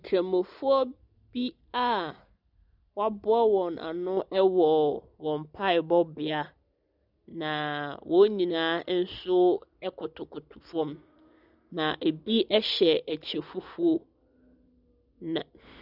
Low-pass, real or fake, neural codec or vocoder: 5.4 kHz; real; none